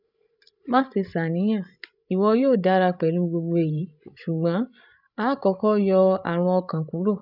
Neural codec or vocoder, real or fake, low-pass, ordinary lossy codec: codec, 16 kHz, 16 kbps, FreqCodec, larger model; fake; 5.4 kHz; none